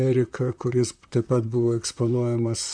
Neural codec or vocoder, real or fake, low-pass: vocoder, 44.1 kHz, 128 mel bands, Pupu-Vocoder; fake; 9.9 kHz